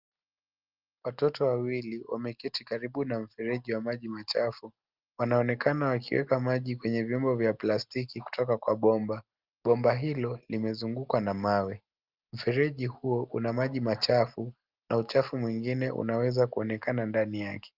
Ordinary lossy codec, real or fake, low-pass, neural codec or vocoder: Opus, 24 kbps; real; 5.4 kHz; none